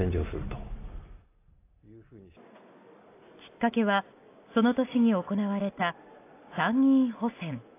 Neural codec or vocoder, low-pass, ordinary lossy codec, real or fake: none; 3.6 kHz; AAC, 24 kbps; real